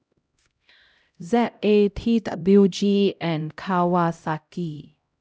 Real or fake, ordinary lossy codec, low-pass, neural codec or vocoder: fake; none; none; codec, 16 kHz, 0.5 kbps, X-Codec, HuBERT features, trained on LibriSpeech